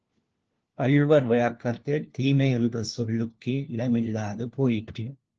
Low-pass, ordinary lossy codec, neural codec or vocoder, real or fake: 7.2 kHz; Opus, 16 kbps; codec, 16 kHz, 1 kbps, FunCodec, trained on LibriTTS, 50 frames a second; fake